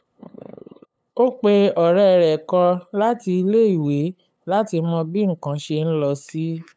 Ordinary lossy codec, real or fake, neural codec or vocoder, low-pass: none; fake; codec, 16 kHz, 8 kbps, FunCodec, trained on LibriTTS, 25 frames a second; none